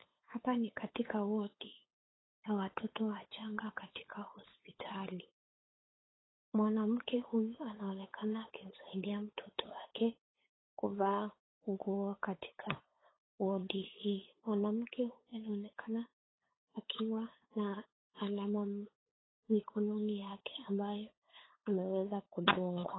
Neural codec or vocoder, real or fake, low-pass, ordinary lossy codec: codec, 16 kHz, 8 kbps, FunCodec, trained on LibriTTS, 25 frames a second; fake; 7.2 kHz; AAC, 16 kbps